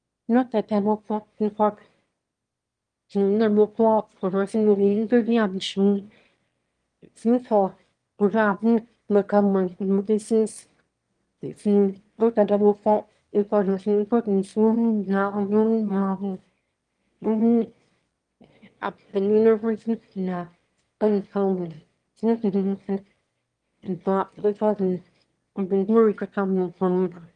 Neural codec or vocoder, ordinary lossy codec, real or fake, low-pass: autoencoder, 22.05 kHz, a latent of 192 numbers a frame, VITS, trained on one speaker; Opus, 24 kbps; fake; 9.9 kHz